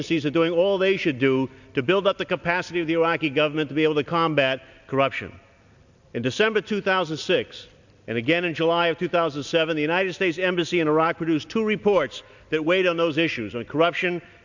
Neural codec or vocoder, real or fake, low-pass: none; real; 7.2 kHz